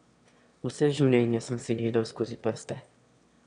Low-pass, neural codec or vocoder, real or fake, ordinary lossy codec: 9.9 kHz; autoencoder, 22.05 kHz, a latent of 192 numbers a frame, VITS, trained on one speaker; fake; none